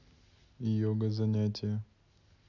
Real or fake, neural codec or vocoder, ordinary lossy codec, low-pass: real; none; none; 7.2 kHz